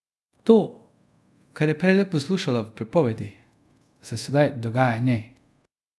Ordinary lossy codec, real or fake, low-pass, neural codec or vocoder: none; fake; none; codec, 24 kHz, 0.5 kbps, DualCodec